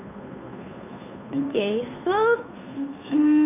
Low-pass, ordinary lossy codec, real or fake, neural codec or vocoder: 3.6 kHz; none; fake; codec, 16 kHz, 2 kbps, FunCodec, trained on Chinese and English, 25 frames a second